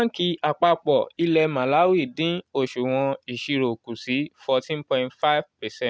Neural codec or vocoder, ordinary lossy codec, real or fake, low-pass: none; none; real; none